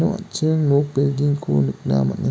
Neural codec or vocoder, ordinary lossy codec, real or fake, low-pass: none; none; real; none